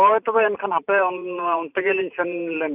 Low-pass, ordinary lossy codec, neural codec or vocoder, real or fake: 3.6 kHz; none; vocoder, 44.1 kHz, 128 mel bands every 512 samples, BigVGAN v2; fake